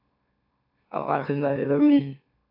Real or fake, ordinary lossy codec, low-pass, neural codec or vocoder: fake; AAC, 48 kbps; 5.4 kHz; autoencoder, 44.1 kHz, a latent of 192 numbers a frame, MeloTTS